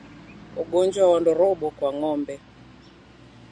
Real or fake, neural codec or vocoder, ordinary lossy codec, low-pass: real; none; Opus, 64 kbps; 9.9 kHz